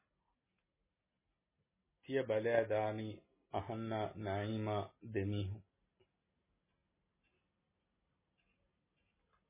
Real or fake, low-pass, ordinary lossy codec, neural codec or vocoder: real; 3.6 kHz; MP3, 16 kbps; none